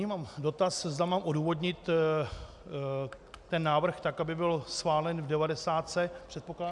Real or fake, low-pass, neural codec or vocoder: real; 10.8 kHz; none